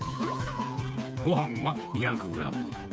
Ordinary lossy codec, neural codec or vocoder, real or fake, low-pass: none; codec, 16 kHz, 4 kbps, FreqCodec, smaller model; fake; none